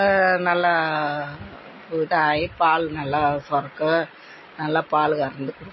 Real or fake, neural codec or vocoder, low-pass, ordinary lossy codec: real; none; 7.2 kHz; MP3, 24 kbps